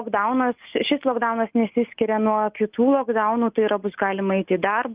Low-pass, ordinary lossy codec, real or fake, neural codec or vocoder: 3.6 kHz; Opus, 32 kbps; real; none